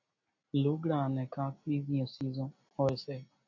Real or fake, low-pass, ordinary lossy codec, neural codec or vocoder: real; 7.2 kHz; AAC, 48 kbps; none